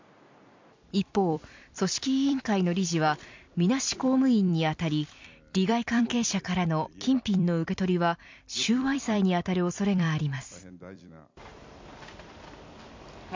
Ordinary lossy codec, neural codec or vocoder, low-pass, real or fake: MP3, 64 kbps; vocoder, 44.1 kHz, 128 mel bands every 256 samples, BigVGAN v2; 7.2 kHz; fake